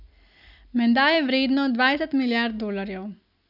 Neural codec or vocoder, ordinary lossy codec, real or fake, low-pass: none; none; real; 5.4 kHz